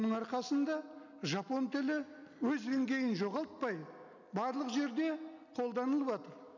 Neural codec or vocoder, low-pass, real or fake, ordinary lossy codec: none; 7.2 kHz; real; none